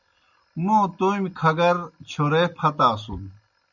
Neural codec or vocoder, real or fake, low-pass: none; real; 7.2 kHz